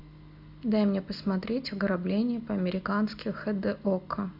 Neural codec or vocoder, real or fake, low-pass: none; real; 5.4 kHz